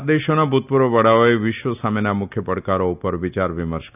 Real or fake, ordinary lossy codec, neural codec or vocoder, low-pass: real; none; none; 3.6 kHz